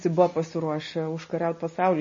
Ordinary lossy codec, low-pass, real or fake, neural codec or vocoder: MP3, 32 kbps; 7.2 kHz; real; none